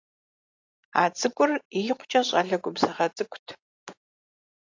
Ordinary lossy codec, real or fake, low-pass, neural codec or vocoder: AAC, 48 kbps; real; 7.2 kHz; none